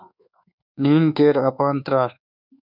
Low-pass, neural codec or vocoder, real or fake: 5.4 kHz; codec, 24 kHz, 1.2 kbps, DualCodec; fake